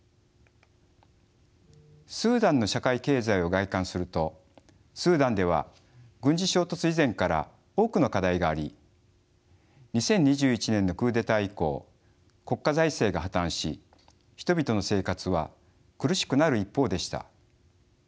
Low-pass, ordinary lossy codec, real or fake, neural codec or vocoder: none; none; real; none